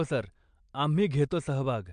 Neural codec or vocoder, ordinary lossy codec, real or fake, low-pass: none; MP3, 64 kbps; real; 9.9 kHz